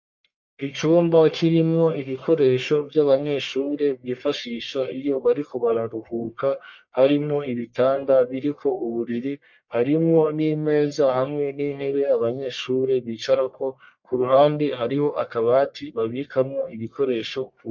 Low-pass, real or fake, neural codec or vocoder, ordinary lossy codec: 7.2 kHz; fake; codec, 44.1 kHz, 1.7 kbps, Pupu-Codec; MP3, 48 kbps